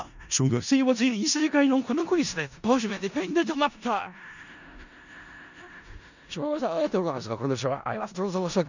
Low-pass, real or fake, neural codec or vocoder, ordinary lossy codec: 7.2 kHz; fake; codec, 16 kHz in and 24 kHz out, 0.4 kbps, LongCat-Audio-Codec, four codebook decoder; none